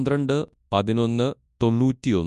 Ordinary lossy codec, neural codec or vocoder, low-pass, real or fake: none; codec, 24 kHz, 0.9 kbps, WavTokenizer, large speech release; 10.8 kHz; fake